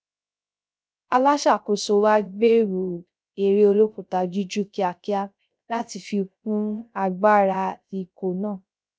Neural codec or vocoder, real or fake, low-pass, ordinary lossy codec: codec, 16 kHz, 0.3 kbps, FocalCodec; fake; none; none